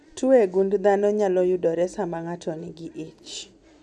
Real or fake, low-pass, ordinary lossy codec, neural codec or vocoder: real; none; none; none